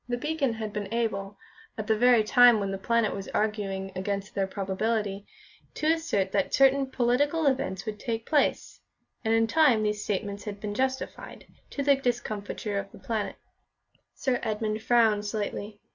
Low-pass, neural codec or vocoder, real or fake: 7.2 kHz; none; real